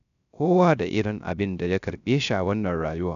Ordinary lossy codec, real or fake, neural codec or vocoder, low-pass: none; fake; codec, 16 kHz, 0.3 kbps, FocalCodec; 7.2 kHz